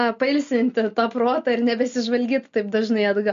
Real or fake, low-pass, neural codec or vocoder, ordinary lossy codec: real; 7.2 kHz; none; MP3, 48 kbps